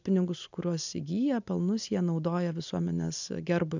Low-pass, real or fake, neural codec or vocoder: 7.2 kHz; real; none